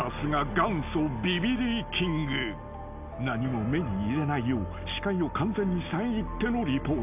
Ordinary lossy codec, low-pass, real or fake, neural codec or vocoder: AAC, 32 kbps; 3.6 kHz; real; none